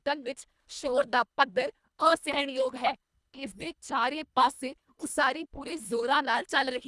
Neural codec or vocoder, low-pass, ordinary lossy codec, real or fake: codec, 24 kHz, 1.5 kbps, HILCodec; none; none; fake